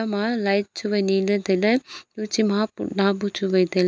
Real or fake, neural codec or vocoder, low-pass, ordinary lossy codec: real; none; none; none